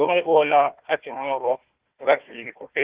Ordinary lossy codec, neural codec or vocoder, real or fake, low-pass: Opus, 16 kbps; codec, 16 kHz, 1 kbps, FunCodec, trained on Chinese and English, 50 frames a second; fake; 3.6 kHz